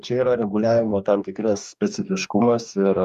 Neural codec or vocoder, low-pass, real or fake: codec, 44.1 kHz, 2.6 kbps, DAC; 14.4 kHz; fake